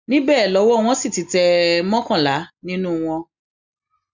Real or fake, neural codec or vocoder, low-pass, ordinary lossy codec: real; none; 7.2 kHz; Opus, 64 kbps